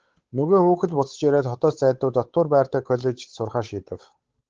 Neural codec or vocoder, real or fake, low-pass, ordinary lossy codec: codec, 16 kHz, 8 kbps, FunCodec, trained on Chinese and English, 25 frames a second; fake; 7.2 kHz; Opus, 16 kbps